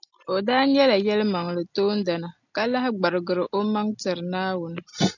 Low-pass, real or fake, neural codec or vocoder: 7.2 kHz; real; none